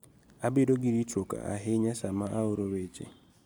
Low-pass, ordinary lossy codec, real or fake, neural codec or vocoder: none; none; real; none